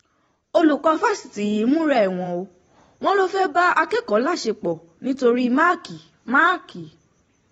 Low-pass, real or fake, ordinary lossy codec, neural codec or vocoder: 19.8 kHz; fake; AAC, 24 kbps; vocoder, 44.1 kHz, 128 mel bands every 512 samples, BigVGAN v2